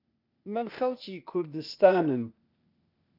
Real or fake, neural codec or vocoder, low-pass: fake; codec, 16 kHz, 0.8 kbps, ZipCodec; 5.4 kHz